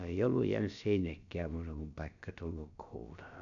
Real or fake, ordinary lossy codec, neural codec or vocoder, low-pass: fake; none; codec, 16 kHz, about 1 kbps, DyCAST, with the encoder's durations; 7.2 kHz